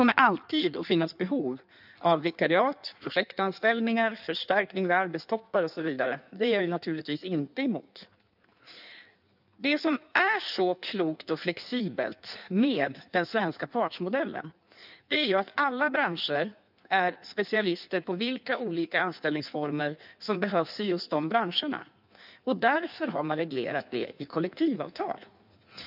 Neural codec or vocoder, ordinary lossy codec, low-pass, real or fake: codec, 16 kHz in and 24 kHz out, 1.1 kbps, FireRedTTS-2 codec; none; 5.4 kHz; fake